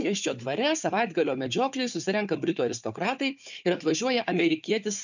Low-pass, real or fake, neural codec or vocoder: 7.2 kHz; fake; codec, 16 kHz, 4 kbps, FunCodec, trained on Chinese and English, 50 frames a second